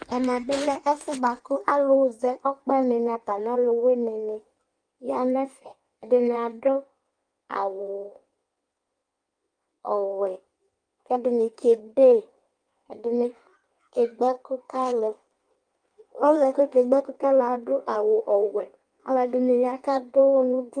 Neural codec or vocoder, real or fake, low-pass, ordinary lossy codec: codec, 16 kHz in and 24 kHz out, 1.1 kbps, FireRedTTS-2 codec; fake; 9.9 kHz; Opus, 32 kbps